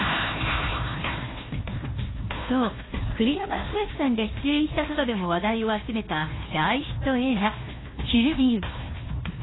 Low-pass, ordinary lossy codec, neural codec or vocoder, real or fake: 7.2 kHz; AAC, 16 kbps; codec, 16 kHz, 1 kbps, FunCodec, trained on Chinese and English, 50 frames a second; fake